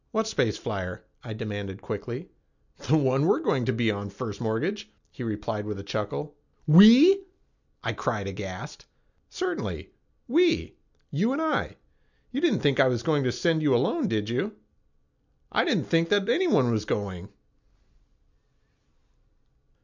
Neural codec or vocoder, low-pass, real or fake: none; 7.2 kHz; real